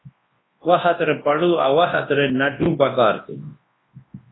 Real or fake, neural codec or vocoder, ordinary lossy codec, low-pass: fake; codec, 24 kHz, 0.9 kbps, WavTokenizer, large speech release; AAC, 16 kbps; 7.2 kHz